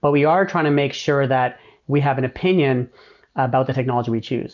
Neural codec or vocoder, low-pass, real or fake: none; 7.2 kHz; real